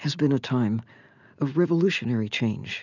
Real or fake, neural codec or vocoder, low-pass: real; none; 7.2 kHz